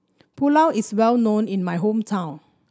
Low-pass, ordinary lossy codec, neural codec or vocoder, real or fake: none; none; none; real